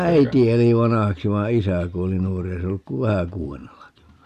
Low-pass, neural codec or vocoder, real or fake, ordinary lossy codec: 14.4 kHz; none; real; none